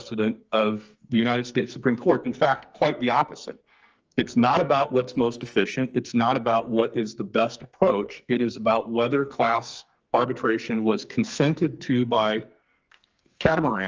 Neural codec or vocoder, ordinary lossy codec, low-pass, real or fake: codec, 44.1 kHz, 2.6 kbps, SNAC; Opus, 24 kbps; 7.2 kHz; fake